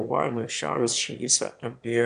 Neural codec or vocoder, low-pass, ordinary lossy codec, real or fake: autoencoder, 22.05 kHz, a latent of 192 numbers a frame, VITS, trained on one speaker; 9.9 kHz; AAC, 96 kbps; fake